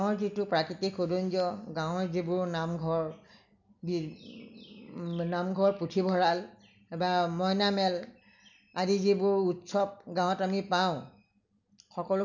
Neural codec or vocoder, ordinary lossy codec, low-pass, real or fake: none; none; 7.2 kHz; real